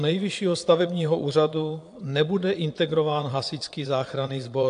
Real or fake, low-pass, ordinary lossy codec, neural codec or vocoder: fake; 9.9 kHz; AAC, 64 kbps; vocoder, 22.05 kHz, 80 mel bands, Vocos